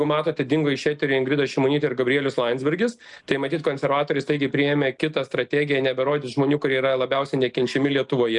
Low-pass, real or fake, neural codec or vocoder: 10.8 kHz; real; none